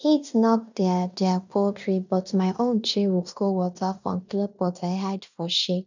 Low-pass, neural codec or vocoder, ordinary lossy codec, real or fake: 7.2 kHz; codec, 16 kHz in and 24 kHz out, 0.9 kbps, LongCat-Audio-Codec, fine tuned four codebook decoder; none; fake